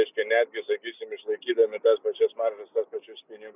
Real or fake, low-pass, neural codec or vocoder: real; 3.6 kHz; none